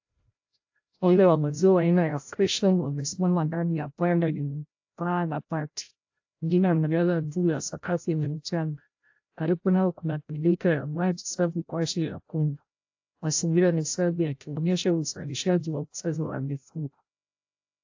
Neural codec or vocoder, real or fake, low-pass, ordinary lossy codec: codec, 16 kHz, 0.5 kbps, FreqCodec, larger model; fake; 7.2 kHz; AAC, 48 kbps